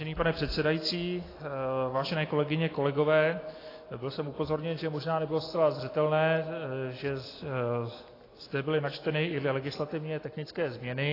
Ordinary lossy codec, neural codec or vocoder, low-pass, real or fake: AAC, 24 kbps; none; 5.4 kHz; real